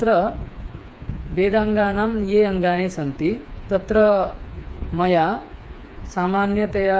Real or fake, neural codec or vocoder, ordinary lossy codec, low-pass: fake; codec, 16 kHz, 4 kbps, FreqCodec, smaller model; none; none